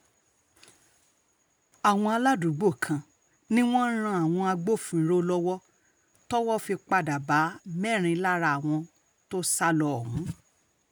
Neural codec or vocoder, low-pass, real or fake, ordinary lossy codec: none; none; real; none